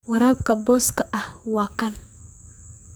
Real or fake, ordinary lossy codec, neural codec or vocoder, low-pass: fake; none; codec, 44.1 kHz, 2.6 kbps, SNAC; none